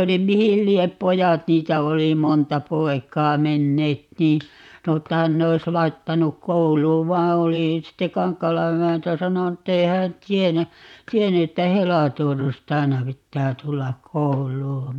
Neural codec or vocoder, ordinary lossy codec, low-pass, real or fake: vocoder, 44.1 kHz, 128 mel bands, Pupu-Vocoder; none; 19.8 kHz; fake